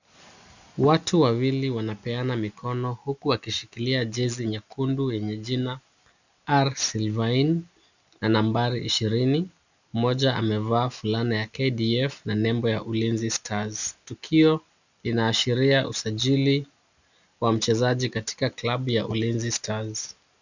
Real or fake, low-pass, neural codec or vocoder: real; 7.2 kHz; none